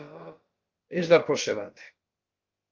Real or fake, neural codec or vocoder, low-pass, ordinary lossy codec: fake; codec, 16 kHz, about 1 kbps, DyCAST, with the encoder's durations; 7.2 kHz; Opus, 32 kbps